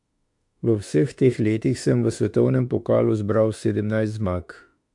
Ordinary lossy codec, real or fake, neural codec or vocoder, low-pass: MP3, 64 kbps; fake; autoencoder, 48 kHz, 32 numbers a frame, DAC-VAE, trained on Japanese speech; 10.8 kHz